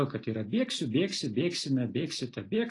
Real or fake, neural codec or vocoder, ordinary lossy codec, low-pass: real; none; AAC, 32 kbps; 10.8 kHz